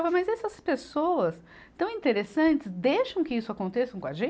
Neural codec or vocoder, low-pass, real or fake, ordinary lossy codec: none; none; real; none